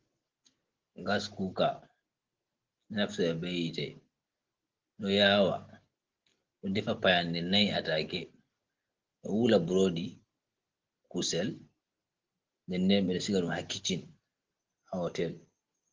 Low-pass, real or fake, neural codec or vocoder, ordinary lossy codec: 7.2 kHz; real; none; Opus, 16 kbps